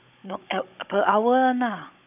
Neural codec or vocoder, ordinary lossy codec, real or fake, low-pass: codec, 16 kHz, 16 kbps, FunCodec, trained on Chinese and English, 50 frames a second; none; fake; 3.6 kHz